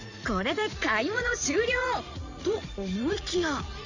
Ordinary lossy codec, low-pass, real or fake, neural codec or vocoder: Opus, 64 kbps; 7.2 kHz; fake; vocoder, 44.1 kHz, 80 mel bands, Vocos